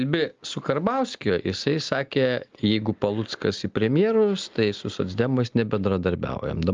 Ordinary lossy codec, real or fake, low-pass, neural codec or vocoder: Opus, 32 kbps; real; 7.2 kHz; none